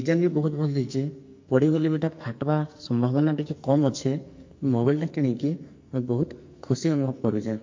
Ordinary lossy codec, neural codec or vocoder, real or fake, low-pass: MP3, 48 kbps; codec, 44.1 kHz, 2.6 kbps, SNAC; fake; 7.2 kHz